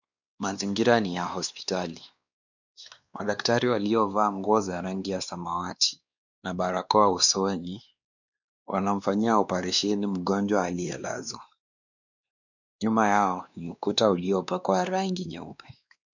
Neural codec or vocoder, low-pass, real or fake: codec, 16 kHz, 2 kbps, X-Codec, WavLM features, trained on Multilingual LibriSpeech; 7.2 kHz; fake